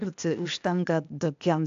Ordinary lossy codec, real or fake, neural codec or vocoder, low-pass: AAC, 64 kbps; fake; codec, 16 kHz, 0.8 kbps, ZipCodec; 7.2 kHz